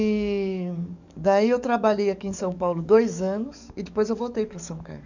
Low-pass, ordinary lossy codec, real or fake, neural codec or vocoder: 7.2 kHz; none; fake; codec, 44.1 kHz, 7.8 kbps, DAC